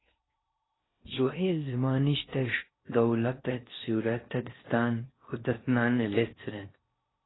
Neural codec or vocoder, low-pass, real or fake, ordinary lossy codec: codec, 16 kHz in and 24 kHz out, 0.6 kbps, FocalCodec, streaming, 4096 codes; 7.2 kHz; fake; AAC, 16 kbps